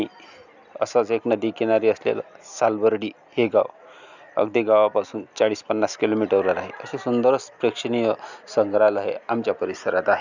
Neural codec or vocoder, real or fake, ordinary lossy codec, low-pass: none; real; none; 7.2 kHz